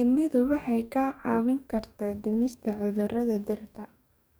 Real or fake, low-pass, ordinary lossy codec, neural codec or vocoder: fake; none; none; codec, 44.1 kHz, 2.6 kbps, DAC